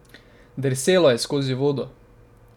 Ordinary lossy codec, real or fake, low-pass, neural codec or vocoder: none; real; 19.8 kHz; none